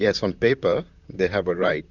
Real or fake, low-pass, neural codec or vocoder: fake; 7.2 kHz; vocoder, 44.1 kHz, 128 mel bands, Pupu-Vocoder